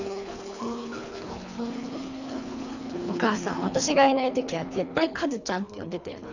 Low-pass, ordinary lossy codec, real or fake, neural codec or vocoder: 7.2 kHz; none; fake; codec, 24 kHz, 3 kbps, HILCodec